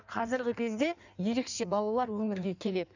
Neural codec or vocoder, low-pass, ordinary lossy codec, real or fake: codec, 16 kHz in and 24 kHz out, 1.1 kbps, FireRedTTS-2 codec; 7.2 kHz; none; fake